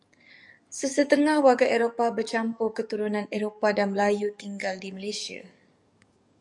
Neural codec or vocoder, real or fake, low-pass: codec, 44.1 kHz, 7.8 kbps, DAC; fake; 10.8 kHz